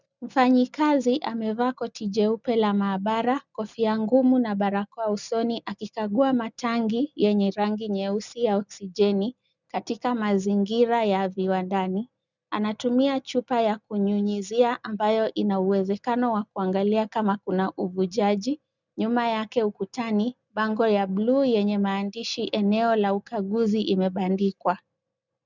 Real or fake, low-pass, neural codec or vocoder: real; 7.2 kHz; none